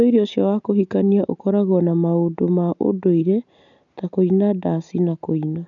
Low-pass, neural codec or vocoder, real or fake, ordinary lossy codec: 7.2 kHz; none; real; none